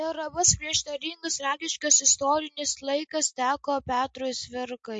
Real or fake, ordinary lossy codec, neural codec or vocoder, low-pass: real; MP3, 48 kbps; none; 9.9 kHz